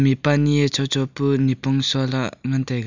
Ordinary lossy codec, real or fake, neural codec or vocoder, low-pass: none; real; none; 7.2 kHz